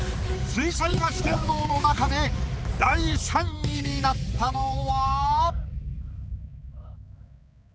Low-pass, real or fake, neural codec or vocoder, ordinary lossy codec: none; fake; codec, 16 kHz, 4 kbps, X-Codec, HuBERT features, trained on balanced general audio; none